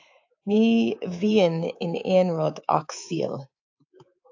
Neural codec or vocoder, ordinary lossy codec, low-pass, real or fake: codec, 24 kHz, 3.1 kbps, DualCodec; AAC, 48 kbps; 7.2 kHz; fake